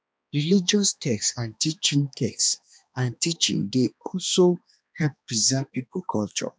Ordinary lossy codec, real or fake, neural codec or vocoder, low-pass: none; fake; codec, 16 kHz, 2 kbps, X-Codec, HuBERT features, trained on balanced general audio; none